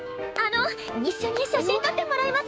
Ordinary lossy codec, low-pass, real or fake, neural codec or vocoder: none; none; fake; codec, 16 kHz, 6 kbps, DAC